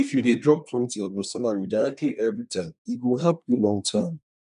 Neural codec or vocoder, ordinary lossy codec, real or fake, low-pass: codec, 24 kHz, 1 kbps, SNAC; none; fake; 10.8 kHz